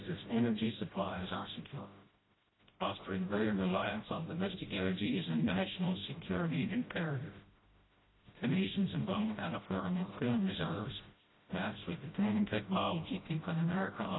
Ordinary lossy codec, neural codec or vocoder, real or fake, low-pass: AAC, 16 kbps; codec, 16 kHz, 0.5 kbps, FreqCodec, smaller model; fake; 7.2 kHz